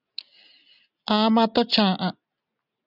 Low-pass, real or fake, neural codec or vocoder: 5.4 kHz; real; none